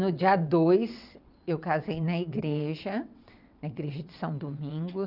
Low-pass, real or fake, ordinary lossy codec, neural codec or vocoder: 5.4 kHz; fake; none; vocoder, 22.05 kHz, 80 mel bands, WaveNeXt